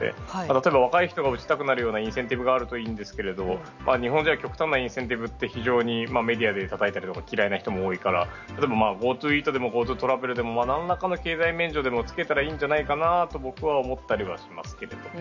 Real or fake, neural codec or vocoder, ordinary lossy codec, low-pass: real; none; none; 7.2 kHz